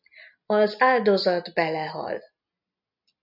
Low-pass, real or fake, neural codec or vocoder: 5.4 kHz; real; none